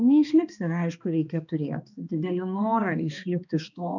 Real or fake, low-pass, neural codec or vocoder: fake; 7.2 kHz; codec, 16 kHz, 2 kbps, X-Codec, HuBERT features, trained on balanced general audio